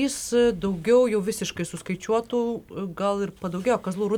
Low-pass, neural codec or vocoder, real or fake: 19.8 kHz; none; real